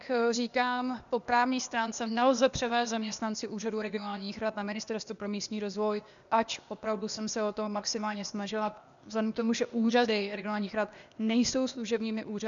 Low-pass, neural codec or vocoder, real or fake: 7.2 kHz; codec, 16 kHz, 0.8 kbps, ZipCodec; fake